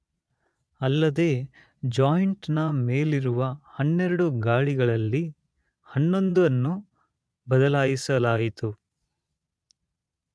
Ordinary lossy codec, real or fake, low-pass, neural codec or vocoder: none; fake; none; vocoder, 22.05 kHz, 80 mel bands, Vocos